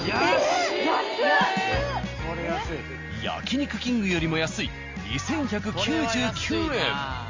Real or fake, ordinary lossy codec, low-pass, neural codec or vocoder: real; Opus, 32 kbps; 7.2 kHz; none